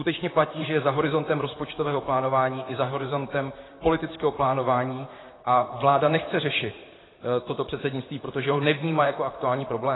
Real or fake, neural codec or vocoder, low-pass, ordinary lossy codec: fake; vocoder, 44.1 kHz, 128 mel bands, Pupu-Vocoder; 7.2 kHz; AAC, 16 kbps